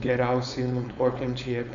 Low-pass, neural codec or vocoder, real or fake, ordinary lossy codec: 7.2 kHz; codec, 16 kHz, 4.8 kbps, FACodec; fake; MP3, 64 kbps